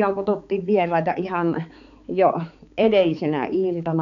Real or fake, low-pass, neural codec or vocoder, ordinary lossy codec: fake; 7.2 kHz; codec, 16 kHz, 4 kbps, X-Codec, HuBERT features, trained on balanced general audio; none